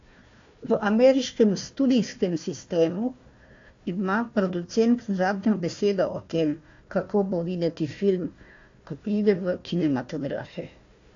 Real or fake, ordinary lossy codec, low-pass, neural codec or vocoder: fake; AAC, 48 kbps; 7.2 kHz; codec, 16 kHz, 1 kbps, FunCodec, trained on Chinese and English, 50 frames a second